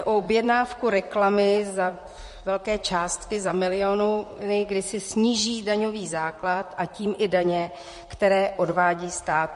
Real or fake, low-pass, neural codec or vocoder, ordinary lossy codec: fake; 14.4 kHz; vocoder, 44.1 kHz, 128 mel bands, Pupu-Vocoder; MP3, 48 kbps